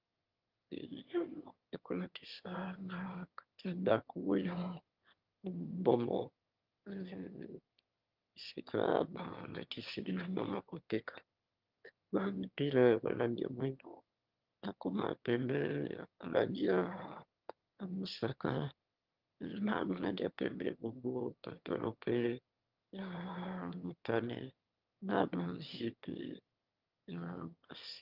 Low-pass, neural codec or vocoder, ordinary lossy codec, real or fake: 5.4 kHz; autoencoder, 22.05 kHz, a latent of 192 numbers a frame, VITS, trained on one speaker; Opus, 32 kbps; fake